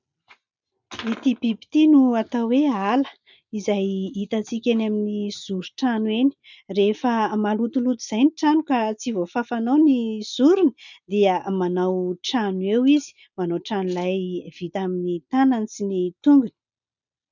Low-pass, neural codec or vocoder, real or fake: 7.2 kHz; none; real